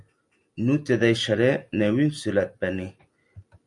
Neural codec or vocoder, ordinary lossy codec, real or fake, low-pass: none; MP3, 96 kbps; real; 10.8 kHz